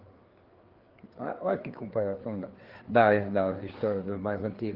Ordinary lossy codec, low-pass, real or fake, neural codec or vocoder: Opus, 16 kbps; 5.4 kHz; fake; codec, 16 kHz, 4 kbps, FreqCodec, larger model